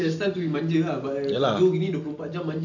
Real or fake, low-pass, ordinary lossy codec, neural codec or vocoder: real; 7.2 kHz; none; none